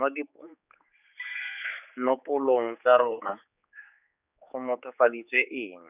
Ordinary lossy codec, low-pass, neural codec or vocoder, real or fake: none; 3.6 kHz; codec, 16 kHz, 4 kbps, X-Codec, HuBERT features, trained on general audio; fake